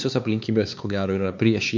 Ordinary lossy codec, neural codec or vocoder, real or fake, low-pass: MP3, 64 kbps; codec, 16 kHz, 4 kbps, X-Codec, HuBERT features, trained on LibriSpeech; fake; 7.2 kHz